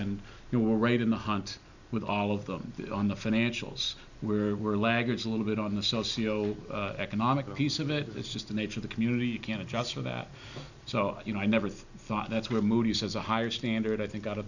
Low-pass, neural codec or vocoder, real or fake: 7.2 kHz; none; real